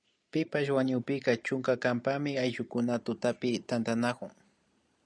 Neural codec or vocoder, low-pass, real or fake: none; 9.9 kHz; real